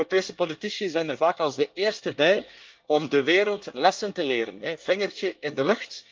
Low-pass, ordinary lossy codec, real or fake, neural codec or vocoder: 7.2 kHz; Opus, 24 kbps; fake; codec, 24 kHz, 1 kbps, SNAC